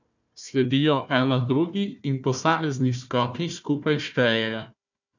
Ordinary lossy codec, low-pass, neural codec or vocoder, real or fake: none; 7.2 kHz; codec, 16 kHz, 1 kbps, FunCodec, trained on Chinese and English, 50 frames a second; fake